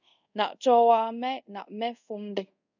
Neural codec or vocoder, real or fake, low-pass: codec, 24 kHz, 0.5 kbps, DualCodec; fake; 7.2 kHz